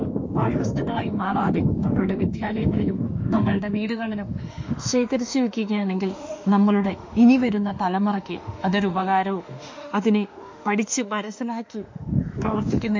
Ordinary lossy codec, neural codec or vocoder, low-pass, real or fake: MP3, 48 kbps; autoencoder, 48 kHz, 32 numbers a frame, DAC-VAE, trained on Japanese speech; 7.2 kHz; fake